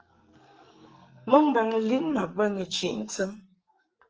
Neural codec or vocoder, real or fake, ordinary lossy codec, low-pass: codec, 44.1 kHz, 2.6 kbps, SNAC; fake; Opus, 24 kbps; 7.2 kHz